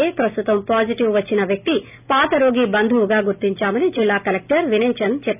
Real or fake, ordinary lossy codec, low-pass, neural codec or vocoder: real; none; 3.6 kHz; none